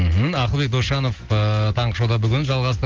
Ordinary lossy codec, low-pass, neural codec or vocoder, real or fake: Opus, 16 kbps; 7.2 kHz; none; real